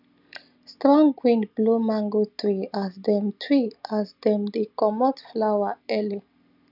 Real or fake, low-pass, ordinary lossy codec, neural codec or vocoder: real; 5.4 kHz; none; none